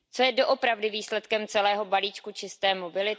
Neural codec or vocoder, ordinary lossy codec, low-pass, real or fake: none; none; none; real